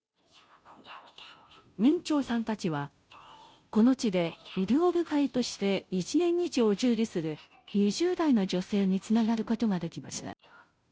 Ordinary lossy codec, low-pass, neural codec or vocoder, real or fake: none; none; codec, 16 kHz, 0.5 kbps, FunCodec, trained on Chinese and English, 25 frames a second; fake